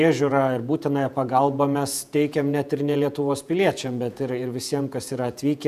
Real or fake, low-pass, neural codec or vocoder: fake; 14.4 kHz; vocoder, 48 kHz, 128 mel bands, Vocos